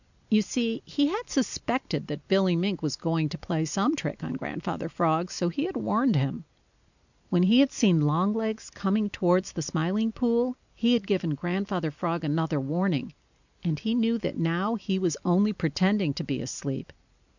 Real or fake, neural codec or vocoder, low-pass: real; none; 7.2 kHz